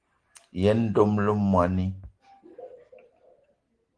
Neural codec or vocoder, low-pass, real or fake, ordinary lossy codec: none; 9.9 kHz; real; Opus, 16 kbps